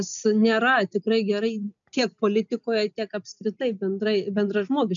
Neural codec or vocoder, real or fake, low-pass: none; real; 7.2 kHz